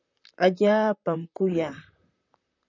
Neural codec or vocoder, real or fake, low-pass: vocoder, 44.1 kHz, 128 mel bands, Pupu-Vocoder; fake; 7.2 kHz